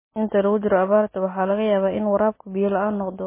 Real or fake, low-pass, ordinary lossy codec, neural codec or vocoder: real; 3.6 kHz; MP3, 16 kbps; none